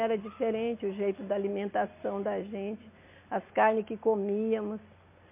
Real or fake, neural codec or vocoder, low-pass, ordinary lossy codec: real; none; 3.6 kHz; MP3, 24 kbps